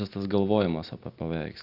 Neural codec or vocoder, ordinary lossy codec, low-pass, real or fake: none; Opus, 64 kbps; 5.4 kHz; real